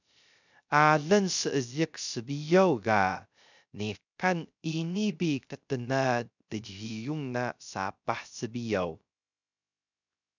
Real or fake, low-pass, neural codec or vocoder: fake; 7.2 kHz; codec, 16 kHz, 0.3 kbps, FocalCodec